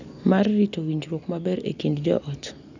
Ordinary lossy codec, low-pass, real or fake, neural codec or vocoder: none; 7.2 kHz; real; none